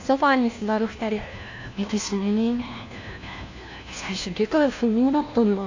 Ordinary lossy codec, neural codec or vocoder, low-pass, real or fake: AAC, 48 kbps; codec, 16 kHz, 1 kbps, FunCodec, trained on LibriTTS, 50 frames a second; 7.2 kHz; fake